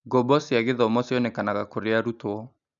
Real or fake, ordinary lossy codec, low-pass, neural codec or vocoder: real; none; 7.2 kHz; none